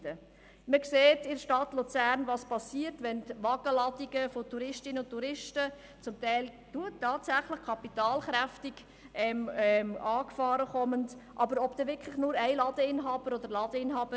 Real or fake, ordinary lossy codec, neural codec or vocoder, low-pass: real; none; none; none